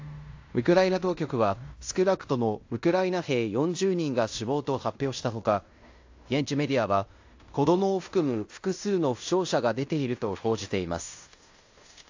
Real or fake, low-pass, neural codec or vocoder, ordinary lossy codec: fake; 7.2 kHz; codec, 16 kHz in and 24 kHz out, 0.9 kbps, LongCat-Audio-Codec, fine tuned four codebook decoder; AAC, 48 kbps